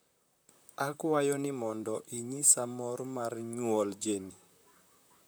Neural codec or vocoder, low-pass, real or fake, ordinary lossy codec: vocoder, 44.1 kHz, 128 mel bands, Pupu-Vocoder; none; fake; none